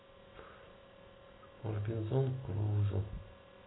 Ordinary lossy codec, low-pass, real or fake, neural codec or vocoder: AAC, 16 kbps; 7.2 kHz; real; none